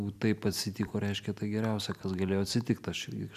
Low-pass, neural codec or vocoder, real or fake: 14.4 kHz; none; real